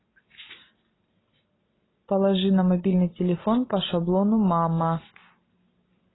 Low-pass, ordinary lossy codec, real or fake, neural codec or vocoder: 7.2 kHz; AAC, 16 kbps; real; none